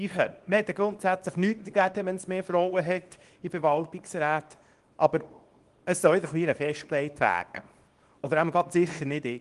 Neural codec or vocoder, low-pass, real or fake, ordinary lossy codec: codec, 24 kHz, 0.9 kbps, WavTokenizer, small release; 10.8 kHz; fake; Opus, 24 kbps